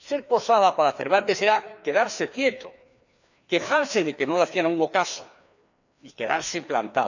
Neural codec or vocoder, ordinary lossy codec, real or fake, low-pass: codec, 16 kHz, 2 kbps, FreqCodec, larger model; none; fake; 7.2 kHz